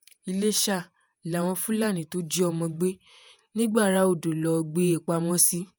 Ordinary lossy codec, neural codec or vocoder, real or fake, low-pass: none; vocoder, 48 kHz, 128 mel bands, Vocos; fake; none